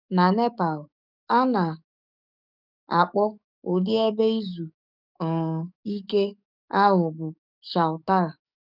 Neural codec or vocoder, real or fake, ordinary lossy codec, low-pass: codec, 44.1 kHz, 7.8 kbps, DAC; fake; none; 5.4 kHz